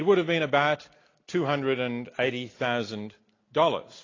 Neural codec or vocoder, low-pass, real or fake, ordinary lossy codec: none; 7.2 kHz; real; AAC, 32 kbps